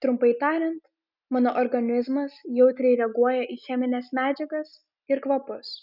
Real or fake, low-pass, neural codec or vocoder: real; 5.4 kHz; none